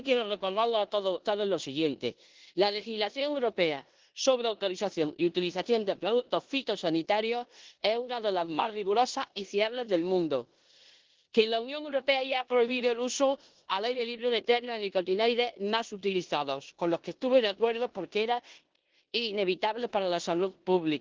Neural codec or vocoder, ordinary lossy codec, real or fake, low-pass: codec, 16 kHz in and 24 kHz out, 0.9 kbps, LongCat-Audio-Codec, four codebook decoder; Opus, 16 kbps; fake; 7.2 kHz